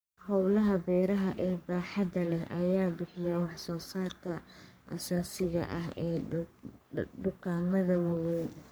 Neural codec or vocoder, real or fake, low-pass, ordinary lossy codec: codec, 44.1 kHz, 3.4 kbps, Pupu-Codec; fake; none; none